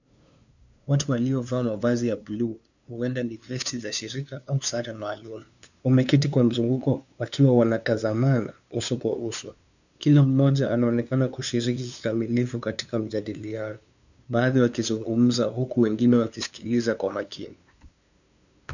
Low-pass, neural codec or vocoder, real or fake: 7.2 kHz; codec, 16 kHz, 2 kbps, FunCodec, trained on LibriTTS, 25 frames a second; fake